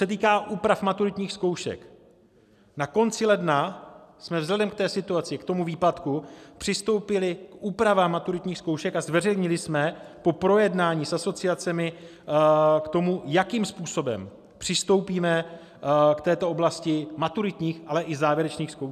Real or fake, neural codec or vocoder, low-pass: real; none; 14.4 kHz